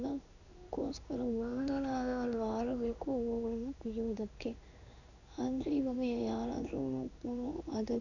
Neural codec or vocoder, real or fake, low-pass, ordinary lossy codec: codec, 16 kHz in and 24 kHz out, 1 kbps, XY-Tokenizer; fake; 7.2 kHz; none